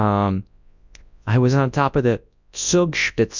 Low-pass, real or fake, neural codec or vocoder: 7.2 kHz; fake; codec, 24 kHz, 0.9 kbps, WavTokenizer, large speech release